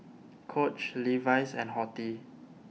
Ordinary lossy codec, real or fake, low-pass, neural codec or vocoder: none; real; none; none